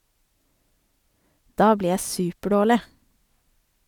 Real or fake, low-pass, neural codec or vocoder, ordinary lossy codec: real; 19.8 kHz; none; none